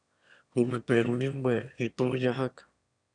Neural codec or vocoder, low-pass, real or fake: autoencoder, 22.05 kHz, a latent of 192 numbers a frame, VITS, trained on one speaker; 9.9 kHz; fake